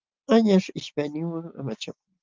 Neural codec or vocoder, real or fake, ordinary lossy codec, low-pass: none; real; Opus, 24 kbps; 7.2 kHz